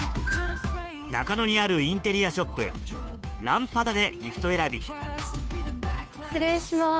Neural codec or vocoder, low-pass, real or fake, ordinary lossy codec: codec, 16 kHz, 2 kbps, FunCodec, trained on Chinese and English, 25 frames a second; none; fake; none